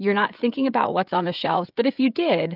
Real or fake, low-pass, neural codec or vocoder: fake; 5.4 kHz; codec, 16 kHz, 16 kbps, FreqCodec, smaller model